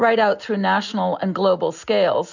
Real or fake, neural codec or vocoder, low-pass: real; none; 7.2 kHz